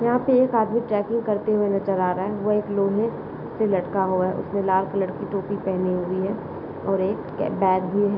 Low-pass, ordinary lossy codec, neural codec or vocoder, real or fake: 5.4 kHz; none; none; real